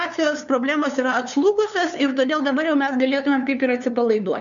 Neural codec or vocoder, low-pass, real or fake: codec, 16 kHz, 2 kbps, FunCodec, trained on LibriTTS, 25 frames a second; 7.2 kHz; fake